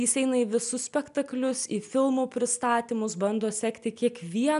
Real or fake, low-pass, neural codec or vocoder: real; 10.8 kHz; none